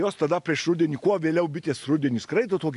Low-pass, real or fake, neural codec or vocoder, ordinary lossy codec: 10.8 kHz; real; none; AAC, 96 kbps